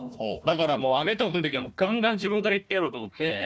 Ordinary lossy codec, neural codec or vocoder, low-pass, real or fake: none; codec, 16 kHz, 1 kbps, FunCodec, trained on Chinese and English, 50 frames a second; none; fake